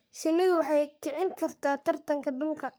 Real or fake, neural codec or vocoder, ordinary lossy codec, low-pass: fake; codec, 44.1 kHz, 3.4 kbps, Pupu-Codec; none; none